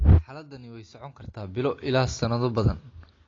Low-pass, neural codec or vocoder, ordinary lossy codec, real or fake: 7.2 kHz; none; AAC, 32 kbps; real